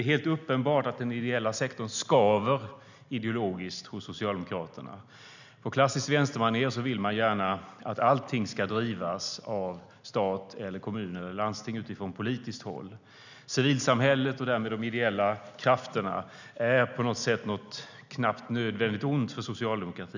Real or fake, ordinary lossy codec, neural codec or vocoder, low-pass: real; none; none; 7.2 kHz